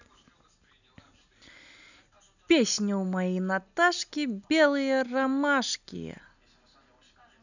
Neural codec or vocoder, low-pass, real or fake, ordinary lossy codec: none; 7.2 kHz; real; none